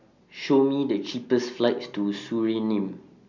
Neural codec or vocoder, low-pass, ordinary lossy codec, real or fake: none; 7.2 kHz; none; real